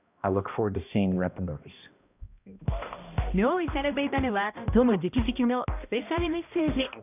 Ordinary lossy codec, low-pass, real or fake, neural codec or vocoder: none; 3.6 kHz; fake; codec, 16 kHz, 1 kbps, X-Codec, HuBERT features, trained on balanced general audio